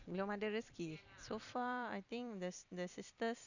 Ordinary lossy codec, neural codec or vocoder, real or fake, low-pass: none; vocoder, 44.1 kHz, 128 mel bands every 256 samples, BigVGAN v2; fake; 7.2 kHz